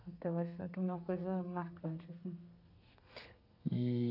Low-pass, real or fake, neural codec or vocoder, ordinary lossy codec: 5.4 kHz; fake; codec, 32 kHz, 1.9 kbps, SNAC; none